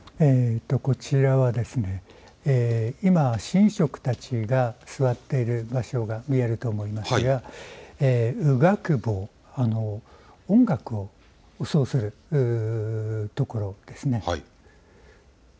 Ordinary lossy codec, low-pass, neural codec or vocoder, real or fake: none; none; none; real